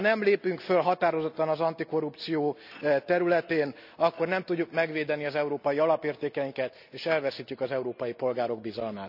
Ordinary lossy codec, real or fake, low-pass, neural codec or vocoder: none; real; 5.4 kHz; none